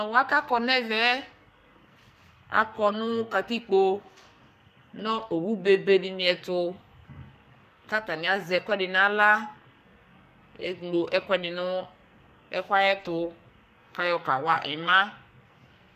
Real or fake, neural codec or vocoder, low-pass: fake; codec, 44.1 kHz, 2.6 kbps, SNAC; 14.4 kHz